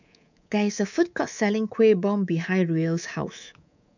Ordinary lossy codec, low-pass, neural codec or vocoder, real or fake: none; 7.2 kHz; codec, 24 kHz, 3.1 kbps, DualCodec; fake